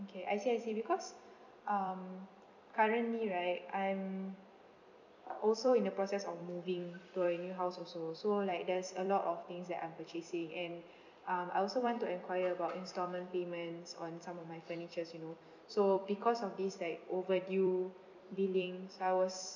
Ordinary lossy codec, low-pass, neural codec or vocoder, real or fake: none; 7.2 kHz; vocoder, 44.1 kHz, 128 mel bands every 256 samples, BigVGAN v2; fake